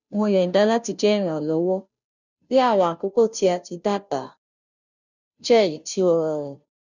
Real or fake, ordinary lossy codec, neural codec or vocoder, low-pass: fake; none; codec, 16 kHz, 0.5 kbps, FunCodec, trained on Chinese and English, 25 frames a second; 7.2 kHz